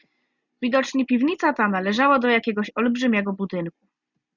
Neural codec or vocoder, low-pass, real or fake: none; 7.2 kHz; real